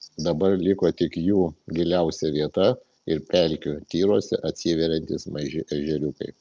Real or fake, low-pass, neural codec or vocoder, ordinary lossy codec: real; 7.2 kHz; none; Opus, 24 kbps